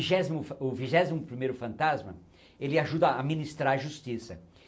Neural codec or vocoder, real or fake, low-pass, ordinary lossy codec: none; real; none; none